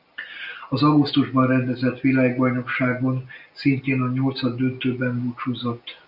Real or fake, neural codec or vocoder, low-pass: real; none; 5.4 kHz